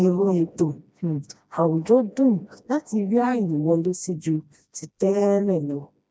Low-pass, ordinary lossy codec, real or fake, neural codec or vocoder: none; none; fake; codec, 16 kHz, 1 kbps, FreqCodec, smaller model